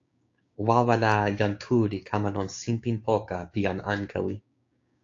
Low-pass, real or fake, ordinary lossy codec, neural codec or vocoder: 7.2 kHz; fake; AAC, 48 kbps; codec, 16 kHz, 6 kbps, DAC